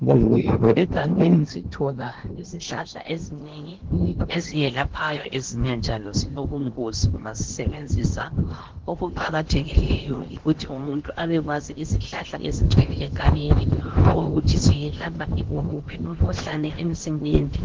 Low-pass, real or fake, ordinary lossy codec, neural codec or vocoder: 7.2 kHz; fake; Opus, 16 kbps; codec, 16 kHz in and 24 kHz out, 0.8 kbps, FocalCodec, streaming, 65536 codes